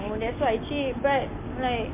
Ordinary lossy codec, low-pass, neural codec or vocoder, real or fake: MP3, 32 kbps; 3.6 kHz; none; real